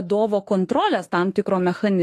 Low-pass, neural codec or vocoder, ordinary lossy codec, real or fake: 14.4 kHz; autoencoder, 48 kHz, 32 numbers a frame, DAC-VAE, trained on Japanese speech; AAC, 48 kbps; fake